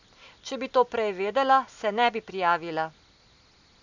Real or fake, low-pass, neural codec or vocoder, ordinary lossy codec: real; 7.2 kHz; none; none